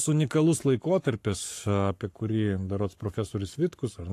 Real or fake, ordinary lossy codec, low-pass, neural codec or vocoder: fake; AAC, 64 kbps; 14.4 kHz; codec, 44.1 kHz, 7.8 kbps, Pupu-Codec